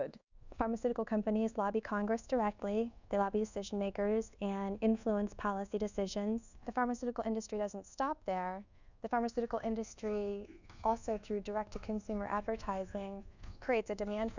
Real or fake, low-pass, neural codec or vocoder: fake; 7.2 kHz; codec, 24 kHz, 1.2 kbps, DualCodec